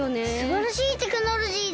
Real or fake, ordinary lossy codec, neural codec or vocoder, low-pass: real; none; none; none